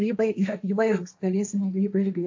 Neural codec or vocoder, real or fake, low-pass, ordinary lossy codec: codec, 16 kHz, 1.1 kbps, Voila-Tokenizer; fake; 7.2 kHz; AAC, 48 kbps